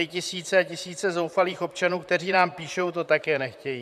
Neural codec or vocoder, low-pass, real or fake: vocoder, 44.1 kHz, 128 mel bands, Pupu-Vocoder; 14.4 kHz; fake